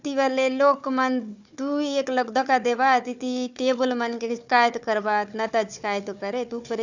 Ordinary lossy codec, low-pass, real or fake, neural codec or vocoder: none; 7.2 kHz; fake; codec, 16 kHz, 4 kbps, FunCodec, trained on Chinese and English, 50 frames a second